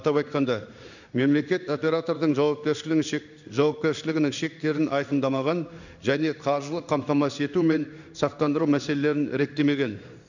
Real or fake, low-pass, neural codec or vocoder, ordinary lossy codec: fake; 7.2 kHz; codec, 16 kHz in and 24 kHz out, 1 kbps, XY-Tokenizer; none